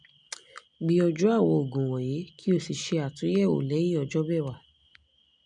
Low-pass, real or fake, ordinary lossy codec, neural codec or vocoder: 9.9 kHz; real; none; none